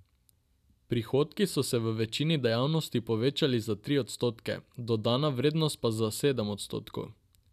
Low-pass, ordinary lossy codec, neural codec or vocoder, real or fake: 14.4 kHz; none; none; real